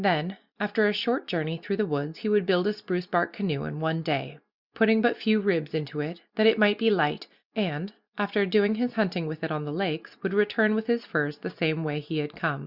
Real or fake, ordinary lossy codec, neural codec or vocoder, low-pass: real; Opus, 64 kbps; none; 5.4 kHz